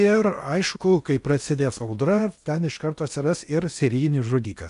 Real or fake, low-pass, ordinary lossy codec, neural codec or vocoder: fake; 10.8 kHz; AAC, 96 kbps; codec, 16 kHz in and 24 kHz out, 0.8 kbps, FocalCodec, streaming, 65536 codes